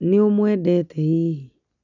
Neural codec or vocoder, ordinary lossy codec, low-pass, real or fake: none; none; 7.2 kHz; real